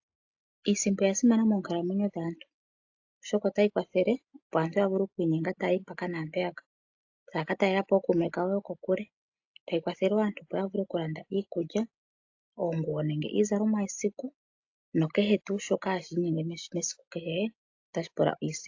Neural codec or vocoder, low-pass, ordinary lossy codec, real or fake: none; 7.2 kHz; AAC, 48 kbps; real